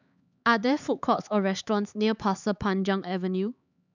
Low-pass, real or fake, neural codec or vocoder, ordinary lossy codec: 7.2 kHz; fake; codec, 16 kHz, 4 kbps, X-Codec, HuBERT features, trained on LibriSpeech; none